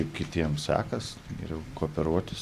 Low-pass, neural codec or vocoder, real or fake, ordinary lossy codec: 14.4 kHz; none; real; Opus, 64 kbps